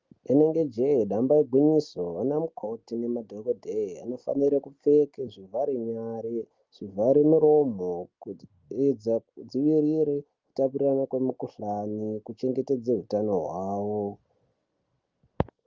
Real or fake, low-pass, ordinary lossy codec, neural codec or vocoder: real; 7.2 kHz; Opus, 32 kbps; none